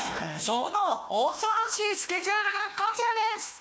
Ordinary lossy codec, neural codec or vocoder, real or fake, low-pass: none; codec, 16 kHz, 1 kbps, FunCodec, trained on Chinese and English, 50 frames a second; fake; none